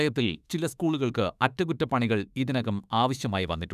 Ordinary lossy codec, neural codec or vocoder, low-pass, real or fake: none; autoencoder, 48 kHz, 32 numbers a frame, DAC-VAE, trained on Japanese speech; 14.4 kHz; fake